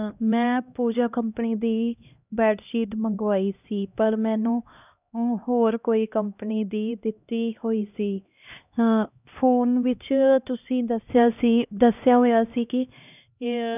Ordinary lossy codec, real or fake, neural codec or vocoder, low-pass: none; fake; codec, 16 kHz, 1 kbps, X-Codec, HuBERT features, trained on LibriSpeech; 3.6 kHz